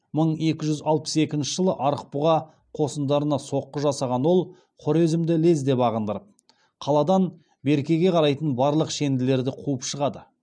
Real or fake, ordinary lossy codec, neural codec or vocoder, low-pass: real; none; none; 9.9 kHz